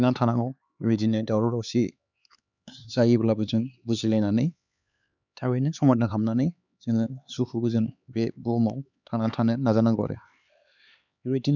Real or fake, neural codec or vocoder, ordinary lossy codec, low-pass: fake; codec, 16 kHz, 4 kbps, X-Codec, HuBERT features, trained on LibriSpeech; none; 7.2 kHz